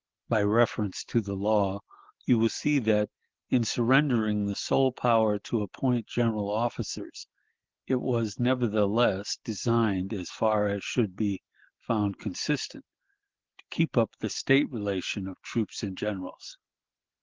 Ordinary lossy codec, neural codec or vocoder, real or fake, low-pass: Opus, 16 kbps; none; real; 7.2 kHz